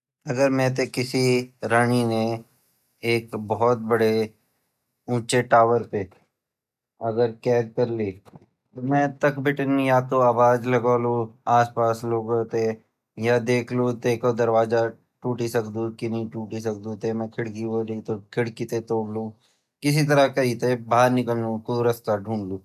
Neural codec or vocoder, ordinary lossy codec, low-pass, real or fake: none; none; 14.4 kHz; real